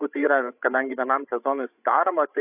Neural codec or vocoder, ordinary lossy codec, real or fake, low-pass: codec, 16 kHz, 16 kbps, FreqCodec, larger model; AAC, 32 kbps; fake; 3.6 kHz